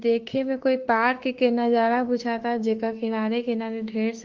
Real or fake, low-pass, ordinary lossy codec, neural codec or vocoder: fake; 7.2 kHz; Opus, 16 kbps; autoencoder, 48 kHz, 32 numbers a frame, DAC-VAE, trained on Japanese speech